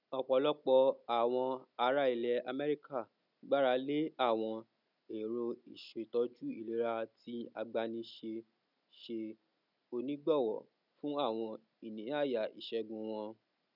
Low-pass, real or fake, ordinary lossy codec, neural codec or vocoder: 5.4 kHz; real; none; none